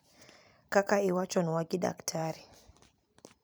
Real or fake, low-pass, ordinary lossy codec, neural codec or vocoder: fake; none; none; vocoder, 44.1 kHz, 128 mel bands every 256 samples, BigVGAN v2